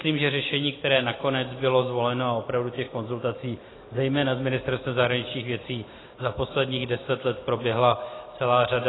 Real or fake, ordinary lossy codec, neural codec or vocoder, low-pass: real; AAC, 16 kbps; none; 7.2 kHz